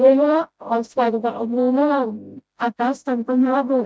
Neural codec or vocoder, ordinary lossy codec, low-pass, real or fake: codec, 16 kHz, 0.5 kbps, FreqCodec, smaller model; none; none; fake